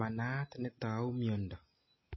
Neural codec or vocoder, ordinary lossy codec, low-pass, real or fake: none; MP3, 24 kbps; 7.2 kHz; real